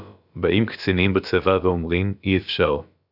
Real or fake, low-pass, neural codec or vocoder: fake; 5.4 kHz; codec, 16 kHz, about 1 kbps, DyCAST, with the encoder's durations